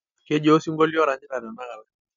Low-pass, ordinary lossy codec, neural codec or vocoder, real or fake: 7.2 kHz; none; none; real